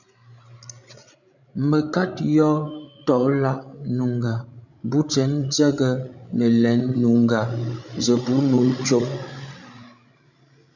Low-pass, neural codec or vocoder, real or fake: 7.2 kHz; codec, 16 kHz, 16 kbps, FreqCodec, larger model; fake